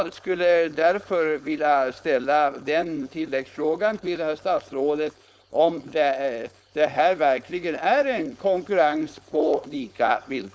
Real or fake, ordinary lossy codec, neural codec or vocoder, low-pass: fake; none; codec, 16 kHz, 4.8 kbps, FACodec; none